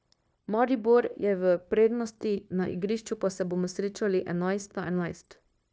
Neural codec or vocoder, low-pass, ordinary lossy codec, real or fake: codec, 16 kHz, 0.9 kbps, LongCat-Audio-Codec; none; none; fake